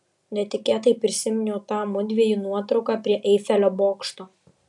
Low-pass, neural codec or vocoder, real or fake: 10.8 kHz; none; real